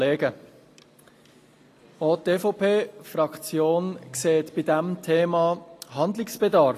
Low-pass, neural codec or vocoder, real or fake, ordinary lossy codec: 14.4 kHz; none; real; AAC, 48 kbps